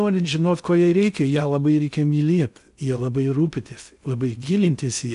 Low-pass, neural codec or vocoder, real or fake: 10.8 kHz; codec, 16 kHz in and 24 kHz out, 0.6 kbps, FocalCodec, streaming, 2048 codes; fake